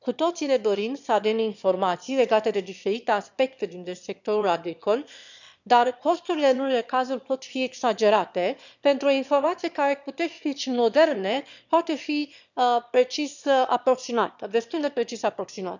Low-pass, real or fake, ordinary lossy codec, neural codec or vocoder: 7.2 kHz; fake; none; autoencoder, 22.05 kHz, a latent of 192 numbers a frame, VITS, trained on one speaker